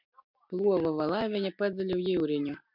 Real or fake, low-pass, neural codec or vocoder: real; 5.4 kHz; none